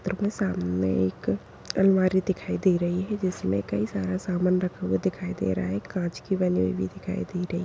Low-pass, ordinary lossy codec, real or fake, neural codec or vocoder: none; none; real; none